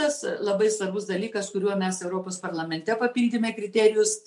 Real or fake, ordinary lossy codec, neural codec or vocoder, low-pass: real; MP3, 96 kbps; none; 10.8 kHz